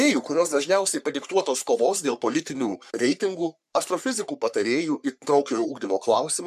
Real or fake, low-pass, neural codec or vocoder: fake; 14.4 kHz; codec, 44.1 kHz, 3.4 kbps, Pupu-Codec